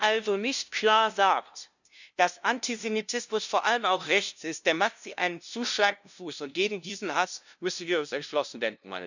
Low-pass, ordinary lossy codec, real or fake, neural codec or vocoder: 7.2 kHz; none; fake; codec, 16 kHz, 0.5 kbps, FunCodec, trained on LibriTTS, 25 frames a second